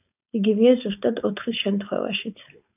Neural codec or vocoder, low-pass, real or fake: codec, 16 kHz, 4.8 kbps, FACodec; 3.6 kHz; fake